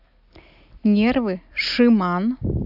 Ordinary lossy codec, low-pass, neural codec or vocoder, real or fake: AAC, 48 kbps; 5.4 kHz; none; real